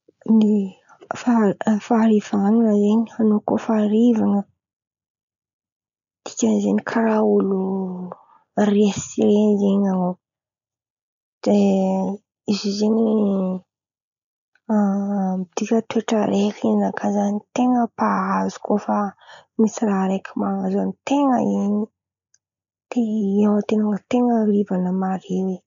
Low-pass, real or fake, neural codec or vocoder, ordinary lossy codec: 7.2 kHz; real; none; none